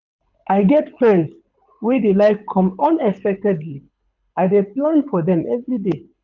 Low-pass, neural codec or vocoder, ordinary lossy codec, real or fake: 7.2 kHz; codec, 24 kHz, 6 kbps, HILCodec; none; fake